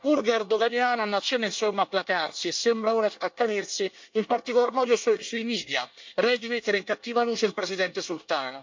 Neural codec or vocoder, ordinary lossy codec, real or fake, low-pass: codec, 24 kHz, 1 kbps, SNAC; MP3, 48 kbps; fake; 7.2 kHz